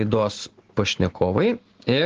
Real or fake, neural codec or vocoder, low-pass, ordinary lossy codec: real; none; 7.2 kHz; Opus, 16 kbps